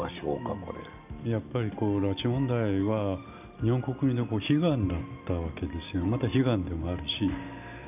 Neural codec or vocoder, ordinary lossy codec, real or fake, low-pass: none; none; real; 3.6 kHz